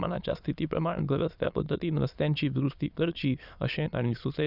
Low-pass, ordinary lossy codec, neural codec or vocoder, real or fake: 5.4 kHz; none; autoencoder, 22.05 kHz, a latent of 192 numbers a frame, VITS, trained on many speakers; fake